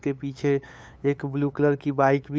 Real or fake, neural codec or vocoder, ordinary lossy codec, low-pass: fake; codec, 16 kHz, 8 kbps, FunCodec, trained on LibriTTS, 25 frames a second; none; none